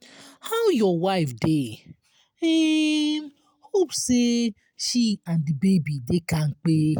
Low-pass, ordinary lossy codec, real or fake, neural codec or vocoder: none; none; real; none